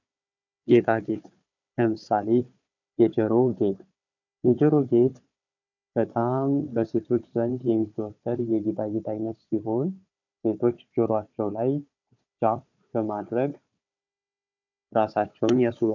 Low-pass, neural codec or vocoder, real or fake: 7.2 kHz; codec, 16 kHz, 4 kbps, FunCodec, trained on Chinese and English, 50 frames a second; fake